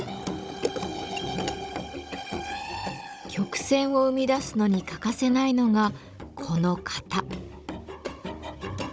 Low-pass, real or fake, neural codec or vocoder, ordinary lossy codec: none; fake; codec, 16 kHz, 16 kbps, FunCodec, trained on Chinese and English, 50 frames a second; none